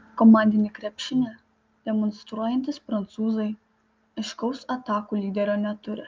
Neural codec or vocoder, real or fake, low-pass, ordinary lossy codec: none; real; 7.2 kHz; Opus, 24 kbps